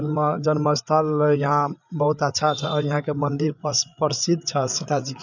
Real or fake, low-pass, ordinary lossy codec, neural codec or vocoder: fake; 7.2 kHz; none; codec, 16 kHz, 8 kbps, FreqCodec, larger model